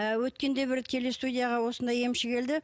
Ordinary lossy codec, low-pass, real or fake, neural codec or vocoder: none; none; real; none